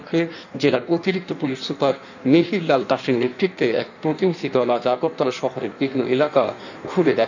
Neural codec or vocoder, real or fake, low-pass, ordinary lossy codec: codec, 16 kHz in and 24 kHz out, 1.1 kbps, FireRedTTS-2 codec; fake; 7.2 kHz; none